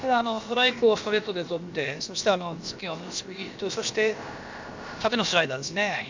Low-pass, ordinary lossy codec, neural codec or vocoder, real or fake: 7.2 kHz; MP3, 64 kbps; codec, 16 kHz, about 1 kbps, DyCAST, with the encoder's durations; fake